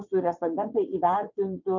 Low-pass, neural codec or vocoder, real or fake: 7.2 kHz; vocoder, 22.05 kHz, 80 mel bands, WaveNeXt; fake